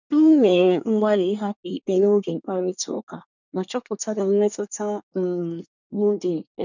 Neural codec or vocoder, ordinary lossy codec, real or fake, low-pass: codec, 24 kHz, 1 kbps, SNAC; none; fake; 7.2 kHz